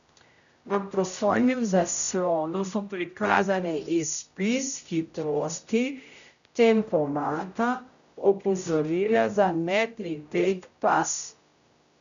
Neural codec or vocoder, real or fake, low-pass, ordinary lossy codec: codec, 16 kHz, 0.5 kbps, X-Codec, HuBERT features, trained on general audio; fake; 7.2 kHz; none